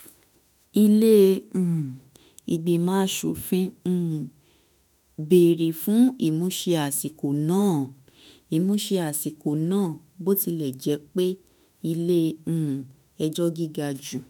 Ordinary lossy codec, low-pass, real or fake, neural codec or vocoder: none; none; fake; autoencoder, 48 kHz, 32 numbers a frame, DAC-VAE, trained on Japanese speech